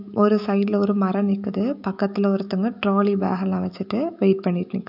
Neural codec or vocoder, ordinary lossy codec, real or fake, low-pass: autoencoder, 48 kHz, 128 numbers a frame, DAC-VAE, trained on Japanese speech; AAC, 48 kbps; fake; 5.4 kHz